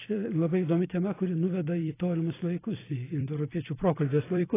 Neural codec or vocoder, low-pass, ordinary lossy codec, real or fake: vocoder, 44.1 kHz, 128 mel bands every 256 samples, BigVGAN v2; 3.6 kHz; AAC, 16 kbps; fake